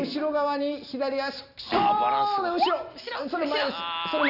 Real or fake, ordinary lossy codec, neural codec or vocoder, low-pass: real; Opus, 64 kbps; none; 5.4 kHz